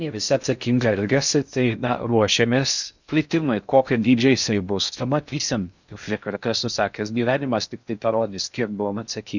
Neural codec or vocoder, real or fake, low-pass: codec, 16 kHz in and 24 kHz out, 0.6 kbps, FocalCodec, streaming, 2048 codes; fake; 7.2 kHz